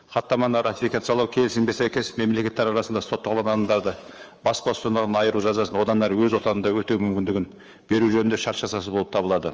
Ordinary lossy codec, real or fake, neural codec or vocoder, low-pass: Opus, 24 kbps; fake; codec, 24 kHz, 3.1 kbps, DualCodec; 7.2 kHz